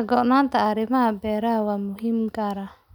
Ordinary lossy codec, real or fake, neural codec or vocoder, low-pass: none; real; none; 19.8 kHz